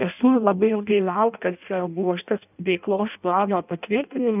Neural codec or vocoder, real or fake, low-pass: codec, 16 kHz in and 24 kHz out, 0.6 kbps, FireRedTTS-2 codec; fake; 3.6 kHz